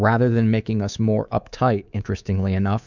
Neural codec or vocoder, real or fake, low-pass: codec, 24 kHz, 3.1 kbps, DualCodec; fake; 7.2 kHz